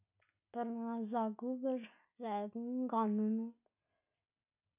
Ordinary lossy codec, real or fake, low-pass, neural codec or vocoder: none; real; 3.6 kHz; none